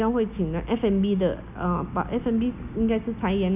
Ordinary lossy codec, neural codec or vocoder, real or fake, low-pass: none; none; real; 3.6 kHz